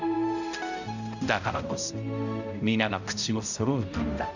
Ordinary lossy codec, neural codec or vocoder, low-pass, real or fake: none; codec, 16 kHz, 0.5 kbps, X-Codec, HuBERT features, trained on balanced general audio; 7.2 kHz; fake